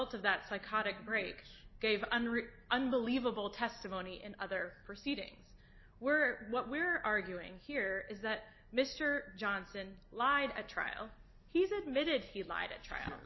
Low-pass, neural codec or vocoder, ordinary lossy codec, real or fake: 7.2 kHz; none; MP3, 24 kbps; real